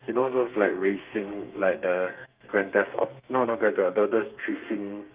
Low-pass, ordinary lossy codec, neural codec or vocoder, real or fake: 3.6 kHz; Opus, 32 kbps; codec, 32 kHz, 1.9 kbps, SNAC; fake